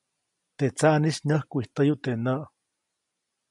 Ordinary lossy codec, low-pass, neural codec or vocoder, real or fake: MP3, 96 kbps; 10.8 kHz; none; real